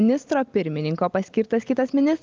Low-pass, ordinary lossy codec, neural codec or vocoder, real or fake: 7.2 kHz; Opus, 24 kbps; none; real